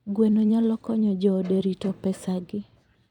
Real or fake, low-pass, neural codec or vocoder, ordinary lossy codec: fake; 19.8 kHz; vocoder, 44.1 kHz, 128 mel bands every 256 samples, BigVGAN v2; none